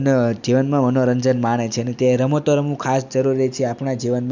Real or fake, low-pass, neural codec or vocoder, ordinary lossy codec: real; 7.2 kHz; none; none